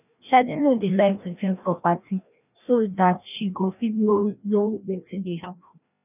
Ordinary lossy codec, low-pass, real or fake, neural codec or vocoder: none; 3.6 kHz; fake; codec, 16 kHz, 1 kbps, FreqCodec, larger model